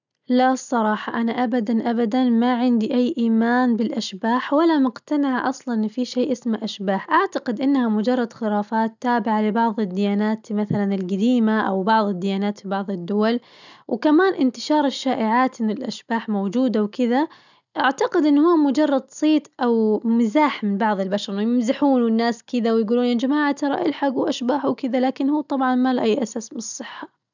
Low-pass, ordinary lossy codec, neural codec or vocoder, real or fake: 7.2 kHz; none; none; real